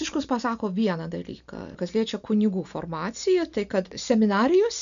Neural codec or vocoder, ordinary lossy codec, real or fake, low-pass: none; MP3, 96 kbps; real; 7.2 kHz